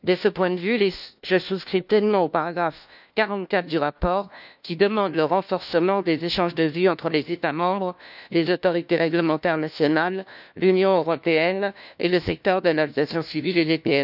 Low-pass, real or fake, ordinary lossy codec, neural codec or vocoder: 5.4 kHz; fake; none; codec, 16 kHz, 1 kbps, FunCodec, trained on LibriTTS, 50 frames a second